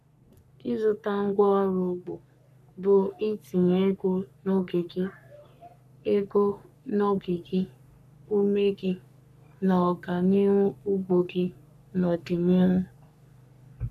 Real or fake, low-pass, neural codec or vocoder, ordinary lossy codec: fake; 14.4 kHz; codec, 44.1 kHz, 3.4 kbps, Pupu-Codec; none